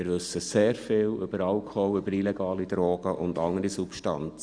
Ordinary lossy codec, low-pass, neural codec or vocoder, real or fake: Opus, 64 kbps; 9.9 kHz; none; real